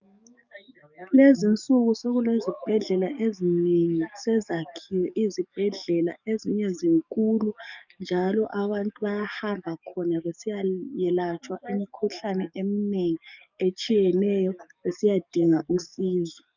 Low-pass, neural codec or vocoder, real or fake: 7.2 kHz; codec, 44.1 kHz, 7.8 kbps, DAC; fake